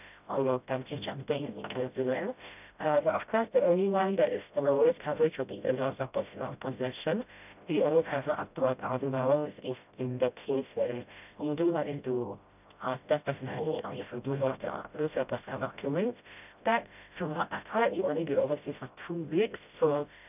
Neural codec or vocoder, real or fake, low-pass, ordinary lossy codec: codec, 16 kHz, 0.5 kbps, FreqCodec, smaller model; fake; 3.6 kHz; none